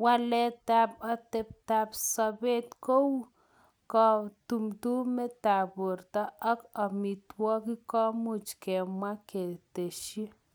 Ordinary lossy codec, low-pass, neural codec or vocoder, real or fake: none; none; none; real